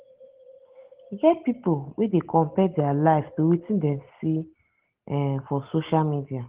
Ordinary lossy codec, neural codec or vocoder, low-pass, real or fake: Opus, 16 kbps; none; 3.6 kHz; real